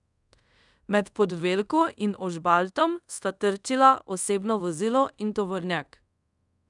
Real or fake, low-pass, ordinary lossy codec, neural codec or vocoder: fake; 10.8 kHz; none; codec, 24 kHz, 0.5 kbps, DualCodec